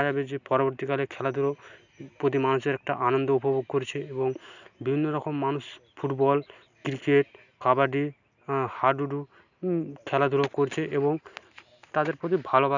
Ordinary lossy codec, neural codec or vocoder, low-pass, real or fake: none; none; 7.2 kHz; real